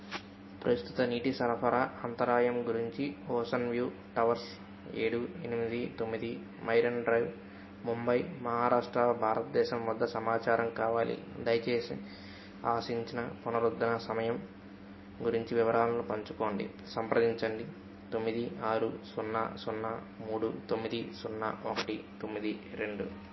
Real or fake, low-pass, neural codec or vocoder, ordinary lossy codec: real; 7.2 kHz; none; MP3, 24 kbps